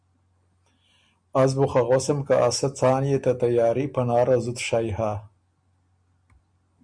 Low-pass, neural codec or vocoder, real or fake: 9.9 kHz; none; real